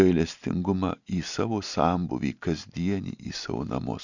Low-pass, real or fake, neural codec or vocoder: 7.2 kHz; real; none